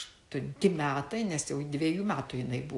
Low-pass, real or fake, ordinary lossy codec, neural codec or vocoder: 10.8 kHz; real; AAC, 64 kbps; none